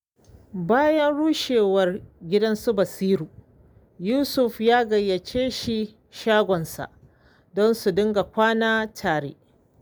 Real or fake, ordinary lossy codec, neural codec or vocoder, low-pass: real; none; none; none